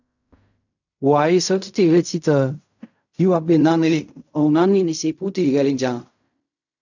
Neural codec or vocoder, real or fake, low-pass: codec, 16 kHz in and 24 kHz out, 0.4 kbps, LongCat-Audio-Codec, fine tuned four codebook decoder; fake; 7.2 kHz